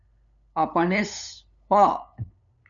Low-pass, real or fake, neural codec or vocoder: 7.2 kHz; fake; codec, 16 kHz, 8 kbps, FunCodec, trained on LibriTTS, 25 frames a second